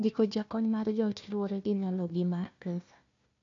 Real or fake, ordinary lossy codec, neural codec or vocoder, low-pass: fake; AAC, 48 kbps; codec, 16 kHz, 1 kbps, FunCodec, trained on Chinese and English, 50 frames a second; 7.2 kHz